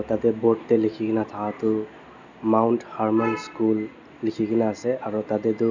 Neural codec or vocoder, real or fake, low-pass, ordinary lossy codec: none; real; 7.2 kHz; none